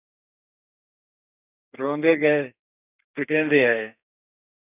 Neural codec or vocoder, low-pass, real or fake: codec, 32 kHz, 1.9 kbps, SNAC; 3.6 kHz; fake